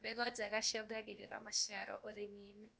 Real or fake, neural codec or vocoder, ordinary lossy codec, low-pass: fake; codec, 16 kHz, about 1 kbps, DyCAST, with the encoder's durations; none; none